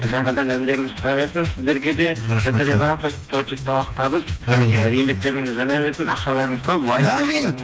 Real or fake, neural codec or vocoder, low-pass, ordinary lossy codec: fake; codec, 16 kHz, 2 kbps, FreqCodec, smaller model; none; none